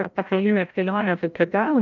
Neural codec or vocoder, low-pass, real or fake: codec, 16 kHz, 0.5 kbps, FreqCodec, larger model; 7.2 kHz; fake